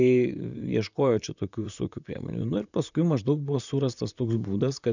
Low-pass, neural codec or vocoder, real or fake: 7.2 kHz; vocoder, 44.1 kHz, 128 mel bands, Pupu-Vocoder; fake